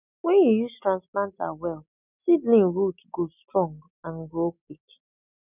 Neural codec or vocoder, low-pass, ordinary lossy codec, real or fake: none; 3.6 kHz; none; real